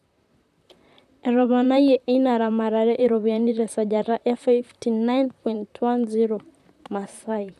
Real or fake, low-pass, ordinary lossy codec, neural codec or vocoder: fake; 14.4 kHz; none; vocoder, 44.1 kHz, 128 mel bands, Pupu-Vocoder